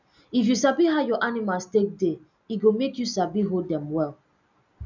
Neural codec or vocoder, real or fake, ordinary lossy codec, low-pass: none; real; none; 7.2 kHz